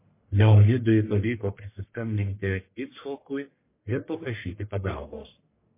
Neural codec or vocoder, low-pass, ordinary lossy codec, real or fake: codec, 44.1 kHz, 1.7 kbps, Pupu-Codec; 3.6 kHz; MP3, 24 kbps; fake